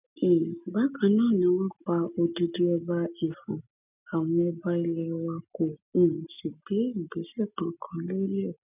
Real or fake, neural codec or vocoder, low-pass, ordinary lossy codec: real; none; 3.6 kHz; none